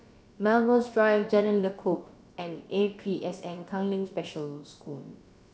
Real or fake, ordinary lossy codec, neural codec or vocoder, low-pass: fake; none; codec, 16 kHz, about 1 kbps, DyCAST, with the encoder's durations; none